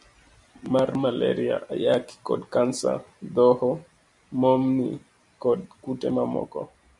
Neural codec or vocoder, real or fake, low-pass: none; real; 10.8 kHz